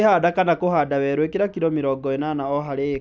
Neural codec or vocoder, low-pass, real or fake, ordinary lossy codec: none; none; real; none